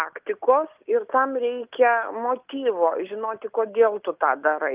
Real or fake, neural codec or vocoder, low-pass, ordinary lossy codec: real; none; 3.6 kHz; Opus, 24 kbps